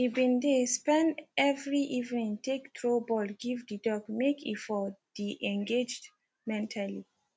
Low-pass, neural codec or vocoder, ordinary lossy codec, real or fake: none; none; none; real